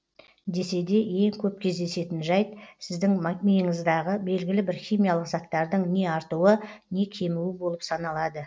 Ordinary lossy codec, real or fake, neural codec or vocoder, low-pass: none; real; none; none